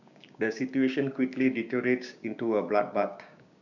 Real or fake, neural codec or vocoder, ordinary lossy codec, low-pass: fake; codec, 16 kHz, 6 kbps, DAC; none; 7.2 kHz